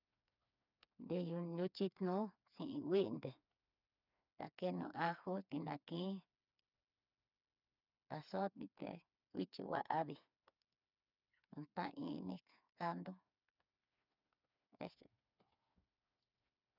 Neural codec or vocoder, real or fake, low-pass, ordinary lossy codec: codec, 16 kHz, 4 kbps, FreqCodec, smaller model; fake; 5.4 kHz; none